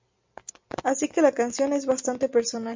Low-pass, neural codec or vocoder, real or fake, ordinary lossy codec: 7.2 kHz; none; real; MP3, 64 kbps